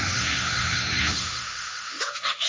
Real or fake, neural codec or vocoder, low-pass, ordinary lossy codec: fake; codec, 16 kHz, 1.1 kbps, Voila-Tokenizer; none; none